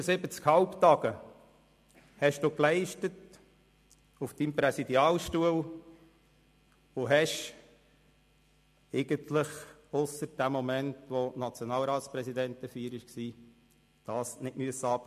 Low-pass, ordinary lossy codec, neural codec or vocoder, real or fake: 14.4 kHz; MP3, 64 kbps; none; real